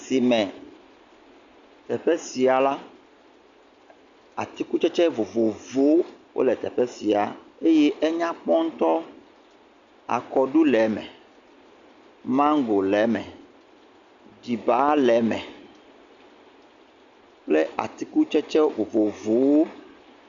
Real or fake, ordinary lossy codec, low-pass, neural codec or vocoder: real; Opus, 64 kbps; 7.2 kHz; none